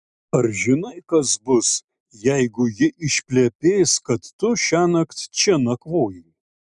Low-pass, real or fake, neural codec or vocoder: 10.8 kHz; real; none